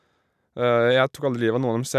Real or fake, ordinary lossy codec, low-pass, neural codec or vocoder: real; none; 14.4 kHz; none